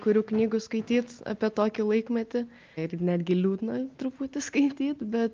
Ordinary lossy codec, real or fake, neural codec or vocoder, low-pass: Opus, 24 kbps; real; none; 7.2 kHz